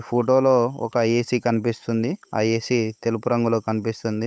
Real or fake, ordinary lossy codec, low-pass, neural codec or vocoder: fake; none; none; codec, 16 kHz, 8 kbps, FunCodec, trained on Chinese and English, 25 frames a second